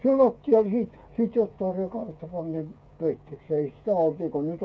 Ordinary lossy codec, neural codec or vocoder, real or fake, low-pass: none; codec, 16 kHz, 8 kbps, FreqCodec, smaller model; fake; none